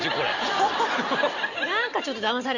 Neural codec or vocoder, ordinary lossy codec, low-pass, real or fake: none; none; 7.2 kHz; real